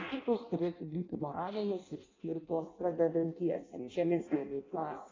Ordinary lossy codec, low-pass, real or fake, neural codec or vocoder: AAC, 32 kbps; 7.2 kHz; fake; codec, 16 kHz in and 24 kHz out, 0.6 kbps, FireRedTTS-2 codec